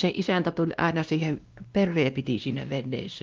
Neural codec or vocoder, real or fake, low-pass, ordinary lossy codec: codec, 16 kHz, 1 kbps, X-Codec, WavLM features, trained on Multilingual LibriSpeech; fake; 7.2 kHz; Opus, 24 kbps